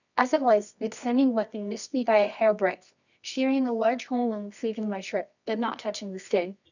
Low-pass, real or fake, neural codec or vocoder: 7.2 kHz; fake; codec, 24 kHz, 0.9 kbps, WavTokenizer, medium music audio release